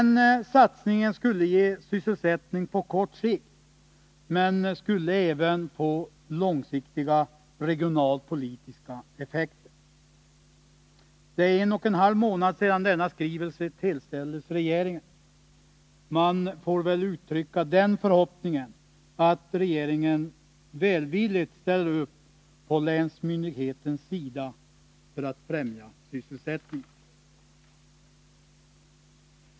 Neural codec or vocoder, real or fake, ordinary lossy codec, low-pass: none; real; none; none